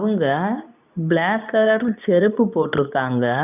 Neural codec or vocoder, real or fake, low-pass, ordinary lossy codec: codec, 24 kHz, 0.9 kbps, WavTokenizer, medium speech release version 2; fake; 3.6 kHz; none